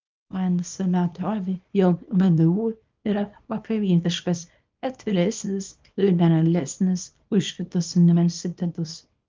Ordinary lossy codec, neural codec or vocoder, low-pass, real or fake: Opus, 24 kbps; codec, 24 kHz, 0.9 kbps, WavTokenizer, small release; 7.2 kHz; fake